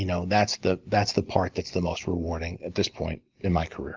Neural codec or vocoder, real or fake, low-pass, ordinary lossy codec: none; real; 7.2 kHz; Opus, 32 kbps